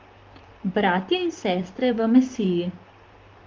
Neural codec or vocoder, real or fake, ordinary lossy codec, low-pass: vocoder, 44.1 kHz, 128 mel bands, Pupu-Vocoder; fake; Opus, 32 kbps; 7.2 kHz